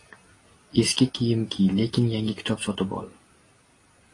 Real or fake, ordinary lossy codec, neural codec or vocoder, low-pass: real; AAC, 32 kbps; none; 10.8 kHz